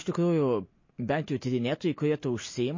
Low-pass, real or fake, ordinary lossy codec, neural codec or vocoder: 7.2 kHz; real; MP3, 32 kbps; none